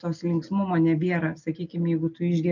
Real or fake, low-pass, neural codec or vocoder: real; 7.2 kHz; none